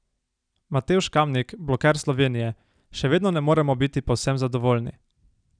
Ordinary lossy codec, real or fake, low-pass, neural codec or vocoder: none; real; 9.9 kHz; none